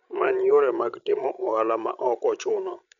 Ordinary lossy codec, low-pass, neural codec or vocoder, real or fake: none; 7.2 kHz; codec, 16 kHz, 16 kbps, FreqCodec, larger model; fake